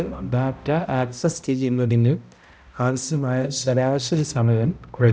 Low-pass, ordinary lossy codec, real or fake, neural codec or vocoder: none; none; fake; codec, 16 kHz, 0.5 kbps, X-Codec, HuBERT features, trained on balanced general audio